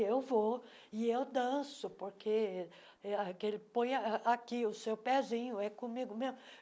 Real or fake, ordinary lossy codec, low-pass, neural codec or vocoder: real; none; none; none